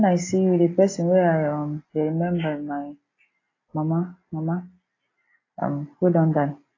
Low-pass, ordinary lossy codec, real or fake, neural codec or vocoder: 7.2 kHz; AAC, 32 kbps; real; none